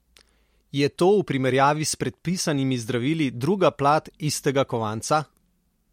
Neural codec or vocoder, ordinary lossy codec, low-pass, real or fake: none; MP3, 64 kbps; 19.8 kHz; real